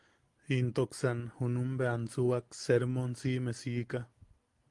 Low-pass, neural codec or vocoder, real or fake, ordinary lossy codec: 10.8 kHz; none; real; Opus, 24 kbps